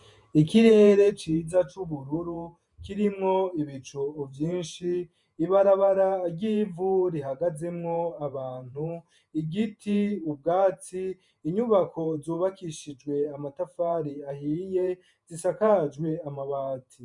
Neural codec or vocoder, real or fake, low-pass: vocoder, 48 kHz, 128 mel bands, Vocos; fake; 10.8 kHz